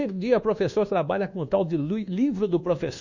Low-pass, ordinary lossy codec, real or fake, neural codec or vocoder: 7.2 kHz; none; fake; codec, 24 kHz, 1.2 kbps, DualCodec